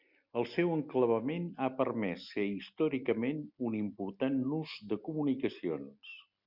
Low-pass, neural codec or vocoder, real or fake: 5.4 kHz; none; real